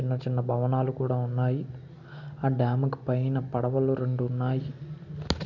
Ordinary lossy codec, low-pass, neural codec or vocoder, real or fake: none; 7.2 kHz; none; real